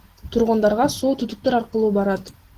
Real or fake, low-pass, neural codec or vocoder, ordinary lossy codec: real; 14.4 kHz; none; Opus, 16 kbps